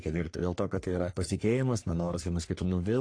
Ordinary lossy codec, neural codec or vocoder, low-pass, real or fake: AAC, 48 kbps; codec, 44.1 kHz, 3.4 kbps, Pupu-Codec; 9.9 kHz; fake